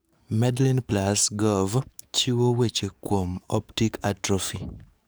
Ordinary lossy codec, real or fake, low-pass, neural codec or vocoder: none; fake; none; codec, 44.1 kHz, 7.8 kbps, DAC